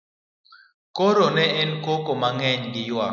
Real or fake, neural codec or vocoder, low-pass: real; none; 7.2 kHz